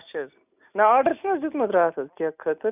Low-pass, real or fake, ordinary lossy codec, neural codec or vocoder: 3.6 kHz; real; none; none